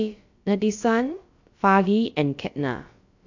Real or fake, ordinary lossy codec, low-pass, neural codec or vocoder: fake; AAC, 48 kbps; 7.2 kHz; codec, 16 kHz, about 1 kbps, DyCAST, with the encoder's durations